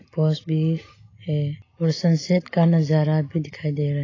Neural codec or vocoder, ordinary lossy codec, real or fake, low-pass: none; AAC, 32 kbps; real; 7.2 kHz